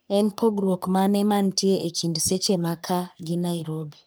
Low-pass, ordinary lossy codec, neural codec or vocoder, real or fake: none; none; codec, 44.1 kHz, 3.4 kbps, Pupu-Codec; fake